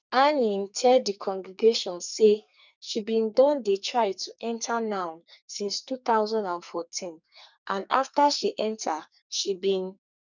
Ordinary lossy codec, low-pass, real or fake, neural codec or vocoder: none; 7.2 kHz; fake; codec, 44.1 kHz, 2.6 kbps, SNAC